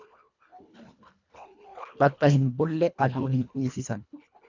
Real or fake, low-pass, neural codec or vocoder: fake; 7.2 kHz; codec, 24 kHz, 1.5 kbps, HILCodec